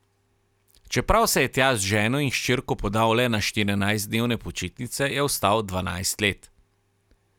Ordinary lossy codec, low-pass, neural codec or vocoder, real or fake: none; 19.8 kHz; none; real